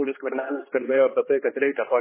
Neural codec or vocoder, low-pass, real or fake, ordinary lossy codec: codec, 16 kHz, 2 kbps, X-Codec, HuBERT features, trained on general audio; 3.6 kHz; fake; MP3, 16 kbps